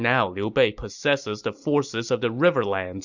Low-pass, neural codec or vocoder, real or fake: 7.2 kHz; none; real